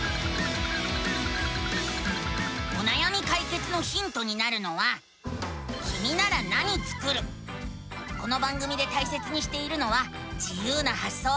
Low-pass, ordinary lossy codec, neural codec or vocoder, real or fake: none; none; none; real